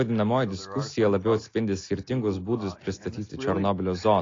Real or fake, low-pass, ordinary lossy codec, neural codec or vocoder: real; 7.2 kHz; AAC, 32 kbps; none